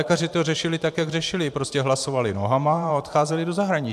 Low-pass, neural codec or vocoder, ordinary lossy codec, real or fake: 14.4 kHz; vocoder, 44.1 kHz, 128 mel bands every 512 samples, BigVGAN v2; AAC, 96 kbps; fake